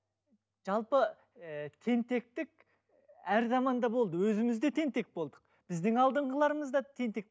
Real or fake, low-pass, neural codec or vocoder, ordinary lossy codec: real; none; none; none